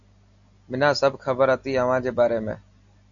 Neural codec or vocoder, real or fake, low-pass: none; real; 7.2 kHz